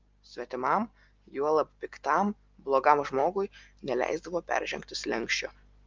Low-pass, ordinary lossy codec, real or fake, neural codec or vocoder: 7.2 kHz; Opus, 24 kbps; real; none